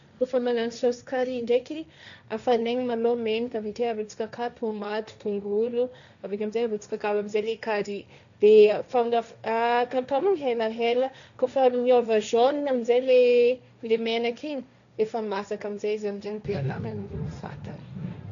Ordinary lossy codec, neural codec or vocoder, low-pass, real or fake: none; codec, 16 kHz, 1.1 kbps, Voila-Tokenizer; 7.2 kHz; fake